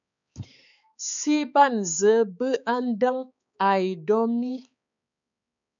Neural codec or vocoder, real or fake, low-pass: codec, 16 kHz, 4 kbps, X-Codec, HuBERT features, trained on balanced general audio; fake; 7.2 kHz